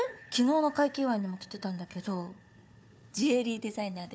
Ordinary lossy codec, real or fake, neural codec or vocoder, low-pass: none; fake; codec, 16 kHz, 4 kbps, FunCodec, trained on Chinese and English, 50 frames a second; none